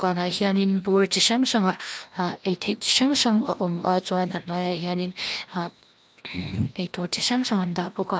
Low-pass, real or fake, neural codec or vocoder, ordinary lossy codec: none; fake; codec, 16 kHz, 1 kbps, FreqCodec, larger model; none